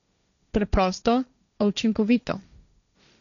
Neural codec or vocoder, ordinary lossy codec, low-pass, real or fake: codec, 16 kHz, 1.1 kbps, Voila-Tokenizer; none; 7.2 kHz; fake